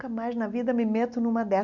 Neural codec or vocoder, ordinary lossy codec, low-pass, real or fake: none; none; 7.2 kHz; real